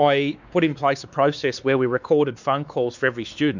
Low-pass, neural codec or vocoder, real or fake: 7.2 kHz; codec, 16 kHz, 4 kbps, X-Codec, WavLM features, trained on Multilingual LibriSpeech; fake